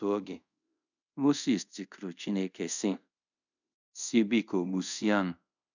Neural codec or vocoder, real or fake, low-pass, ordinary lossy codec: codec, 24 kHz, 0.5 kbps, DualCodec; fake; 7.2 kHz; none